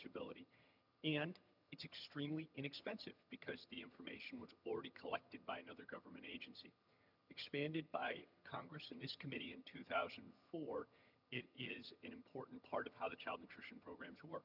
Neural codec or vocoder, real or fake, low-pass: vocoder, 22.05 kHz, 80 mel bands, HiFi-GAN; fake; 5.4 kHz